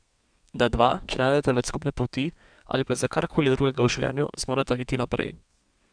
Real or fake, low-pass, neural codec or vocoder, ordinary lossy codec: fake; 9.9 kHz; codec, 32 kHz, 1.9 kbps, SNAC; none